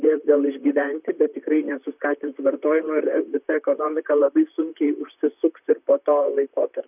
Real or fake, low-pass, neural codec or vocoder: fake; 3.6 kHz; vocoder, 44.1 kHz, 128 mel bands, Pupu-Vocoder